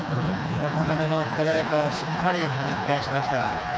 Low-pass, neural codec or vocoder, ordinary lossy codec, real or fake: none; codec, 16 kHz, 2 kbps, FreqCodec, smaller model; none; fake